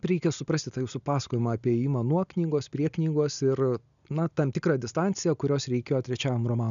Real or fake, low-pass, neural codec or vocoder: real; 7.2 kHz; none